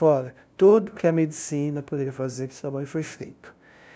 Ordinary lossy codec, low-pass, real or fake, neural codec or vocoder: none; none; fake; codec, 16 kHz, 0.5 kbps, FunCodec, trained on LibriTTS, 25 frames a second